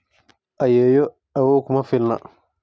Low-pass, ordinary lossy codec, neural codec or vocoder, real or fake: none; none; none; real